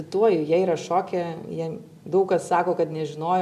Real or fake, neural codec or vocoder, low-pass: real; none; 14.4 kHz